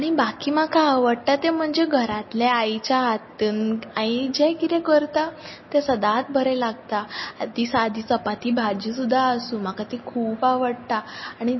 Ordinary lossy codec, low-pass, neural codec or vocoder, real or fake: MP3, 24 kbps; 7.2 kHz; none; real